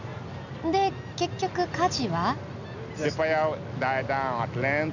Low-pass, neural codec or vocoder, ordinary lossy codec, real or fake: 7.2 kHz; none; none; real